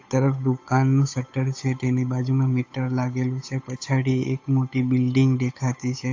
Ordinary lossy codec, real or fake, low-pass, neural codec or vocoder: AAC, 48 kbps; fake; 7.2 kHz; codec, 16 kHz, 8 kbps, FunCodec, trained on Chinese and English, 25 frames a second